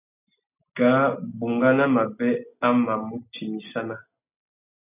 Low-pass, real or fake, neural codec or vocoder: 3.6 kHz; real; none